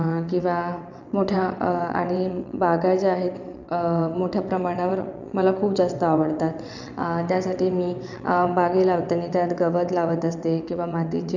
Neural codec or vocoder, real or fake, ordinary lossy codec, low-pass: vocoder, 22.05 kHz, 80 mel bands, WaveNeXt; fake; none; 7.2 kHz